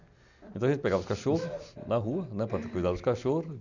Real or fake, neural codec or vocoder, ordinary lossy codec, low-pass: real; none; none; 7.2 kHz